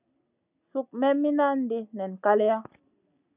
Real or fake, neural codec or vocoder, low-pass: real; none; 3.6 kHz